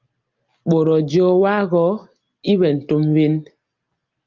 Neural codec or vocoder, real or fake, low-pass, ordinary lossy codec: none; real; 7.2 kHz; Opus, 24 kbps